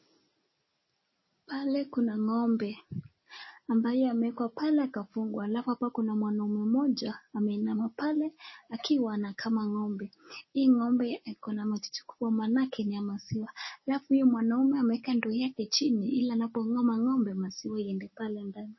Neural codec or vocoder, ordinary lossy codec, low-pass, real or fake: none; MP3, 24 kbps; 7.2 kHz; real